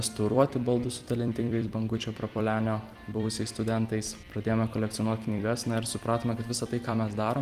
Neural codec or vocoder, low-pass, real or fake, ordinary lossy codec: vocoder, 44.1 kHz, 128 mel bands every 256 samples, BigVGAN v2; 14.4 kHz; fake; Opus, 24 kbps